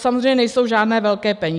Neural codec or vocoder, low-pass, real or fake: none; 10.8 kHz; real